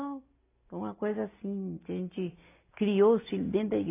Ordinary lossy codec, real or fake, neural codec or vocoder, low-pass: AAC, 24 kbps; real; none; 3.6 kHz